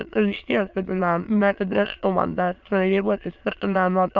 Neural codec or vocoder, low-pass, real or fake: autoencoder, 22.05 kHz, a latent of 192 numbers a frame, VITS, trained on many speakers; 7.2 kHz; fake